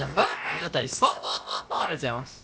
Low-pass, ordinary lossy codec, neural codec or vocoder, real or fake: none; none; codec, 16 kHz, about 1 kbps, DyCAST, with the encoder's durations; fake